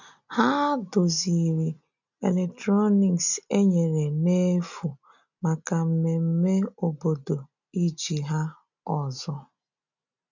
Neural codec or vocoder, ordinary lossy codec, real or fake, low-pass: none; none; real; 7.2 kHz